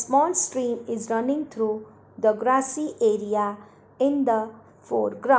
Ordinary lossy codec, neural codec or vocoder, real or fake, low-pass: none; none; real; none